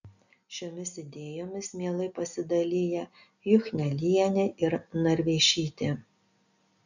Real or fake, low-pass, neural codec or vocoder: real; 7.2 kHz; none